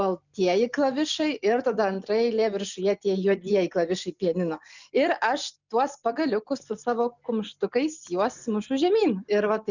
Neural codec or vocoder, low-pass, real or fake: none; 7.2 kHz; real